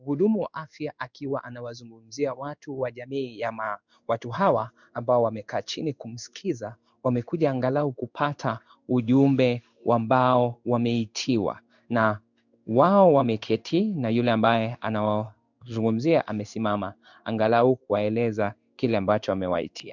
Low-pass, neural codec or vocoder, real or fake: 7.2 kHz; codec, 16 kHz in and 24 kHz out, 1 kbps, XY-Tokenizer; fake